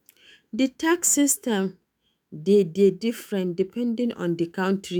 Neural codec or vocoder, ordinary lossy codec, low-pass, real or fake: autoencoder, 48 kHz, 128 numbers a frame, DAC-VAE, trained on Japanese speech; none; none; fake